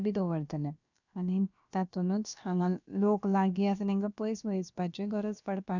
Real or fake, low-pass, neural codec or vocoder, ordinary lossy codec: fake; 7.2 kHz; codec, 16 kHz, about 1 kbps, DyCAST, with the encoder's durations; none